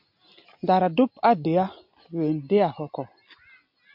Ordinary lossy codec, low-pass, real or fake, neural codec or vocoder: MP3, 48 kbps; 5.4 kHz; real; none